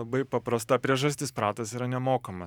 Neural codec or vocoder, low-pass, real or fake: vocoder, 44.1 kHz, 128 mel bands every 512 samples, BigVGAN v2; 19.8 kHz; fake